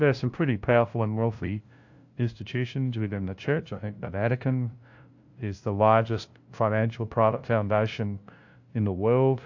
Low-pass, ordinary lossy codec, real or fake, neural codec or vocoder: 7.2 kHz; AAC, 48 kbps; fake; codec, 16 kHz, 0.5 kbps, FunCodec, trained on LibriTTS, 25 frames a second